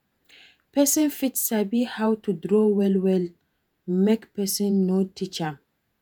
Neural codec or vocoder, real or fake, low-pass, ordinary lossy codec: vocoder, 48 kHz, 128 mel bands, Vocos; fake; none; none